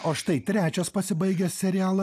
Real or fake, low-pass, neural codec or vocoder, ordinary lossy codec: real; 14.4 kHz; none; AAC, 96 kbps